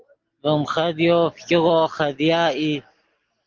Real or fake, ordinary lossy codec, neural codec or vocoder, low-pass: real; Opus, 16 kbps; none; 7.2 kHz